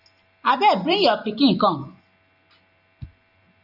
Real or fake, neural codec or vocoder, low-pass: real; none; 5.4 kHz